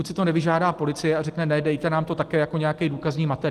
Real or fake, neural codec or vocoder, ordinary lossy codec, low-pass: real; none; Opus, 24 kbps; 14.4 kHz